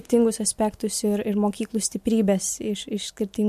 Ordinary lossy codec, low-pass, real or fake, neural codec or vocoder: MP3, 64 kbps; 14.4 kHz; real; none